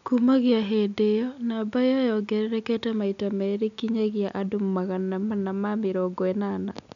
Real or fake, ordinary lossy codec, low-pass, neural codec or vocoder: real; none; 7.2 kHz; none